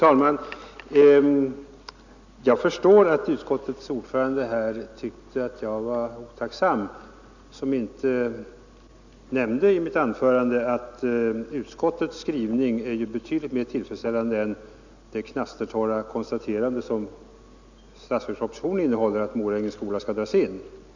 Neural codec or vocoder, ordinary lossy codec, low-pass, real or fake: none; none; 7.2 kHz; real